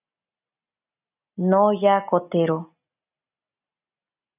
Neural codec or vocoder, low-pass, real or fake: none; 3.6 kHz; real